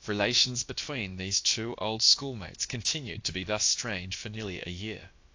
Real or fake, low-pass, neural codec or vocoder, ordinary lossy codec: fake; 7.2 kHz; codec, 16 kHz, about 1 kbps, DyCAST, with the encoder's durations; AAC, 48 kbps